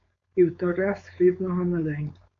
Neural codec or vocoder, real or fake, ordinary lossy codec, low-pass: codec, 16 kHz, 4.8 kbps, FACodec; fake; AAC, 64 kbps; 7.2 kHz